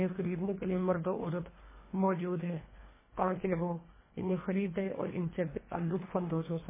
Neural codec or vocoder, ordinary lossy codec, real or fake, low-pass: codec, 24 kHz, 1.5 kbps, HILCodec; MP3, 16 kbps; fake; 3.6 kHz